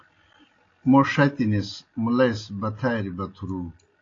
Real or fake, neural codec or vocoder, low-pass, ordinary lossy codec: real; none; 7.2 kHz; AAC, 32 kbps